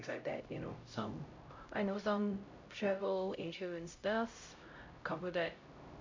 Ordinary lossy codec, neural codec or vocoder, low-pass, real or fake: MP3, 64 kbps; codec, 16 kHz, 0.5 kbps, X-Codec, HuBERT features, trained on LibriSpeech; 7.2 kHz; fake